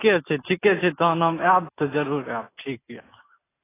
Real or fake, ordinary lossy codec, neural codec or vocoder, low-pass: real; AAC, 16 kbps; none; 3.6 kHz